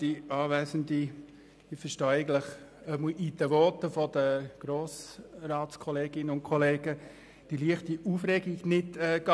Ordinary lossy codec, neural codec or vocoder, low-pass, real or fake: none; none; none; real